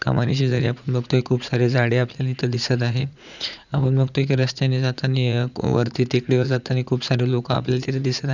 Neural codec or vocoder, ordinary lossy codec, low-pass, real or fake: vocoder, 44.1 kHz, 80 mel bands, Vocos; none; 7.2 kHz; fake